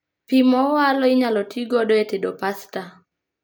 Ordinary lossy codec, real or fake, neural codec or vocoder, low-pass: none; real; none; none